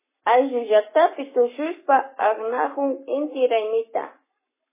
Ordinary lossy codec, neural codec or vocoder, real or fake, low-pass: MP3, 16 kbps; codec, 44.1 kHz, 7.8 kbps, Pupu-Codec; fake; 3.6 kHz